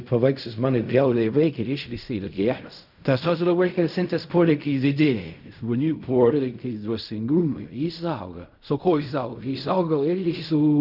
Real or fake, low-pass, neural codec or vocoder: fake; 5.4 kHz; codec, 16 kHz in and 24 kHz out, 0.4 kbps, LongCat-Audio-Codec, fine tuned four codebook decoder